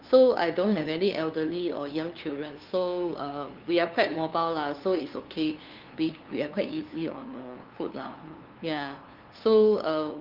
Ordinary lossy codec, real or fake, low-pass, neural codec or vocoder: Opus, 32 kbps; fake; 5.4 kHz; codec, 16 kHz, 2 kbps, FunCodec, trained on LibriTTS, 25 frames a second